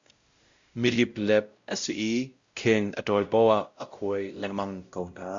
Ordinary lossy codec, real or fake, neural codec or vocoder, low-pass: Opus, 64 kbps; fake; codec, 16 kHz, 0.5 kbps, X-Codec, WavLM features, trained on Multilingual LibriSpeech; 7.2 kHz